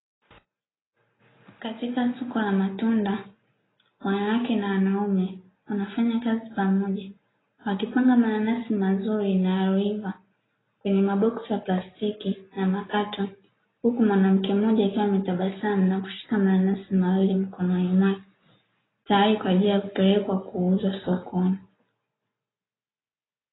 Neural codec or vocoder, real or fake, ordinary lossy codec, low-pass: none; real; AAC, 16 kbps; 7.2 kHz